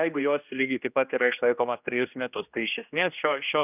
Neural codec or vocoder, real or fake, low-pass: codec, 16 kHz, 1 kbps, X-Codec, HuBERT features, trained on general audio; fake; 3.6 kHz